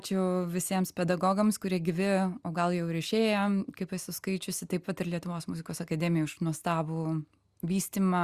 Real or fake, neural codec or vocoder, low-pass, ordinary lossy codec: real; none; 14.4 kHz; Opus, 64 kbps